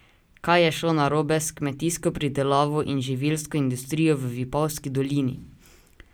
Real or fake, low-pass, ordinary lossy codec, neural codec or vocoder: real; none; none; none